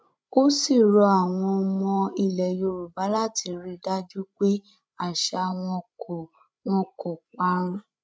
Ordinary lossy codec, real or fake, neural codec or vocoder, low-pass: none; fake; codec, 16 kHz, 16 kbps, FreqCodec, larger model; none